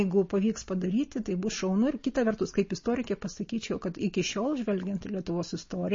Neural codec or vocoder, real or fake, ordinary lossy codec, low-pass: none; real; MP3, 32 kbps; 7.2 kHz